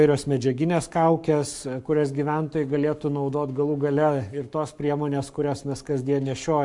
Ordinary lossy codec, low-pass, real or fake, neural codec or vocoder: MP3, 64 kbps; 10.8 kHz; fake; codec, 44.1 kHz, 7.8 kbps, Pupu-Codec